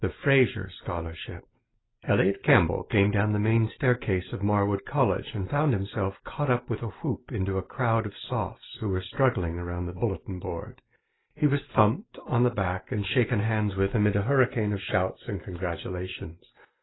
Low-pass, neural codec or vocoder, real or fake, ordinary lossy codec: 7.2 kHz; none; real; AAC, 16 kbps